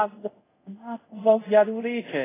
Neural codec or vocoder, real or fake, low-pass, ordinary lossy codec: codec, 24 kHz, 0.5 kbps, DualCodec; fake; 3.6 kHz; AAC, 16 kbps